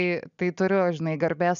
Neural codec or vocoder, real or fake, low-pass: none; real; 7.2 kHz